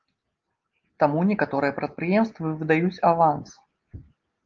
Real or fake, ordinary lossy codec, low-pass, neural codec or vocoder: real; Opus, 24 kbps; 7.2 kHz; none